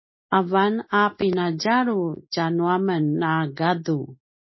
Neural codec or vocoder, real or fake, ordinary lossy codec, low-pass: none; real; MP3, 24 kbps; 7.2 kHz